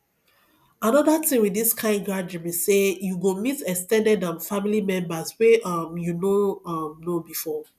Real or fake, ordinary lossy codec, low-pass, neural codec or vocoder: real; none; 14.4 kHz; none